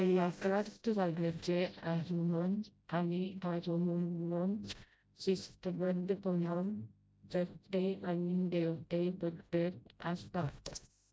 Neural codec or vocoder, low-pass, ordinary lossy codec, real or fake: codec, 16 kHz, 0.5 kbps, FreqCodec, smaller model; none; none; fake